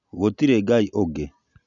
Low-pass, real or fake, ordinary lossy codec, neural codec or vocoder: 7.2 kHz; real; none; none